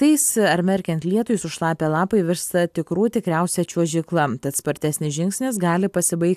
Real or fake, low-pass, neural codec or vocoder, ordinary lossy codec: real; 14.4 kHz; none; AAC, 96 kbps